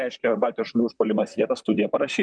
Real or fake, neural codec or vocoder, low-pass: fake; vocoder, 44.1 kHz, 128 mel bands, Pupu-Vocoder; 9.9 kHz